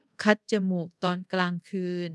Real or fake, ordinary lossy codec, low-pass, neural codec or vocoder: fake; none; none; codec, 24 kHz, 0.5 kbps, DualCodec